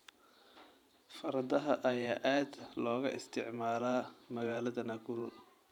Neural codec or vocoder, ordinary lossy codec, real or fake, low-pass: vocoder, 44.1 kHz, 128 mel bands every 512 samples, BigVGAN v2; none; fake; 19.8 kHz